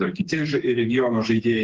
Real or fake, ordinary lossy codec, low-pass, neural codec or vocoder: fake; Opus, 16 kbps; 7.2 kHz; codec, 16 kHz, 4 kbps, FreqCodec, smaller model